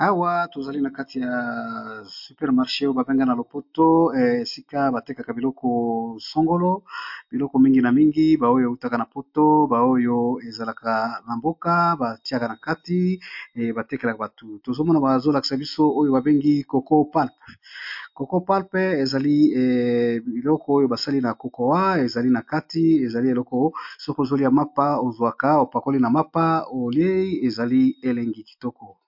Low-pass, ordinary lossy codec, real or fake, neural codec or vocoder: 5.4 kHz; MP3, 48 kbps; real; none